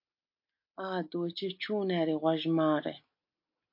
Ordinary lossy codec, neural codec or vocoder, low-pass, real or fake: MP3, 32 kbps; none; 5.4 kHz; real